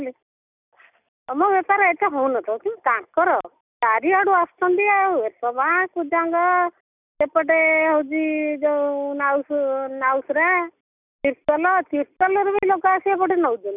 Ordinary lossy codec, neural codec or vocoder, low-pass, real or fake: none; none; 3.6 kHz; real